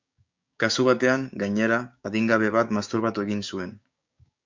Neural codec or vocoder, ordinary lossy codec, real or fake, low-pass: codec, 16 kHz, 6 kbps, DAC; MP3, 64 kbps; fake; 7.2 kHz